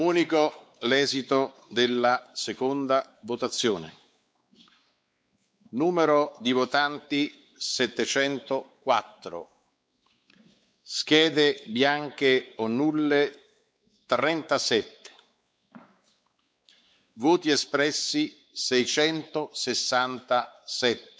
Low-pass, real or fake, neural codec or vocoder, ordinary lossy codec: none; fake; codec, 16 kHz, 4 kbps, X-Codec, WavLM features, trained on Multilingual LibriSpeech; none